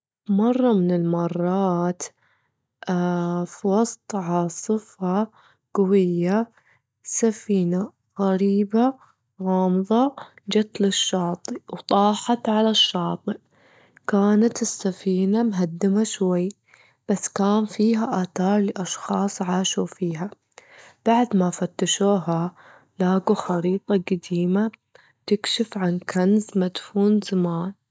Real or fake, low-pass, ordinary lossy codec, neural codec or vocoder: real; none; none; none